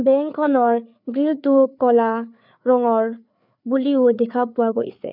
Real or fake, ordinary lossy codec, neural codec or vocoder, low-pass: fake; none; codec, 16 kHz, 4 kbps, FreqCodec, larger model; 5.4 kHz